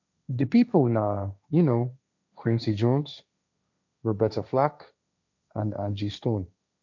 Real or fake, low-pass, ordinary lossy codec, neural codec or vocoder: fake; 7.2 kHz; none; codec, 16 kHz, 1.1 kbps, Voila-Tokenizer